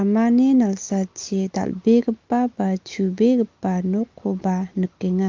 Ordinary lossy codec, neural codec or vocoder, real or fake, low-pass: Opus, 24 kbps; none; real; 7.2 kHz